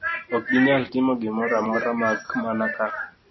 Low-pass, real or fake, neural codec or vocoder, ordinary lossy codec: 7.2 kHz; real; none; MP3, 24 kbps